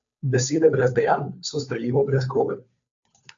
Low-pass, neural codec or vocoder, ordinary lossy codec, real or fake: 7.2 kHz; codec, 16 kHz, 2 kbps, FunCodec, trained on Chinese and English, 25 frames a second; AAC, 64 kbps; fake